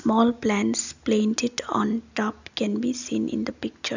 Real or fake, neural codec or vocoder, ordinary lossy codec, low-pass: real; none; none; 7.2 kHz